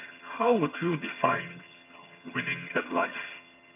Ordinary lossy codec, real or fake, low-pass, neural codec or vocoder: MP3, 32 kbps; fake; 3.6 kHz; vocoder, 22.05 kHz, 80 mel bands, HiFi-GAN